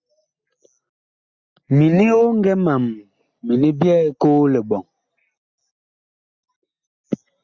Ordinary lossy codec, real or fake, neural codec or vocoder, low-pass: Opus, 64 kbps; real; none; 7.2 kHz